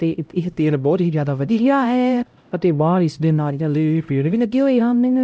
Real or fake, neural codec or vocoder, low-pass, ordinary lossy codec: fake; codec, 16 kHz, 0.5 kbps, X-Codec, HuBERT features, trained on LibriSpeech; none; none